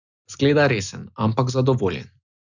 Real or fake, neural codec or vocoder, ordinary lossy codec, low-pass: real; none; none; 7.2 kHz